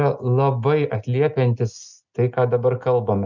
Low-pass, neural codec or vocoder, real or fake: 7.2 kHz; none; real